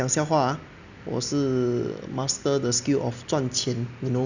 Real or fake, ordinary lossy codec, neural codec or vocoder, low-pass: real; none; none; 7.2 kHz